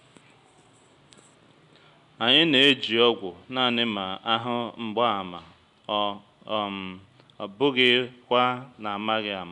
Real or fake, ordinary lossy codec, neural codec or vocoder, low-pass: real; none; none; 10.8 kHz